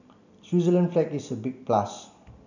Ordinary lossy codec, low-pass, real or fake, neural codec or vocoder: none; 7.2 kHz; real; none